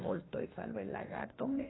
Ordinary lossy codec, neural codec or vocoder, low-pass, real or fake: AAC, 16 kbps; autoencoder, 48 kHz, 32 numbers a frame, DAC-VAE, trained on Japanese speech; 7.2 kHz; fake